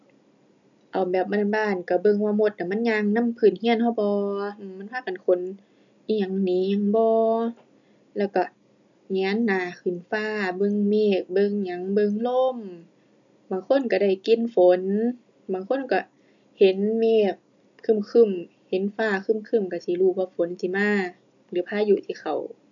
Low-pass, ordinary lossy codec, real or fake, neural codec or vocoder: 7.2 kHz; none; real; none